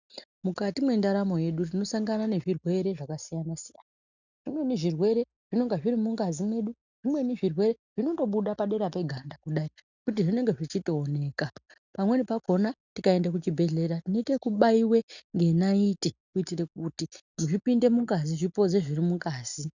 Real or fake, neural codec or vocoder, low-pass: real; none; 7.2 kHz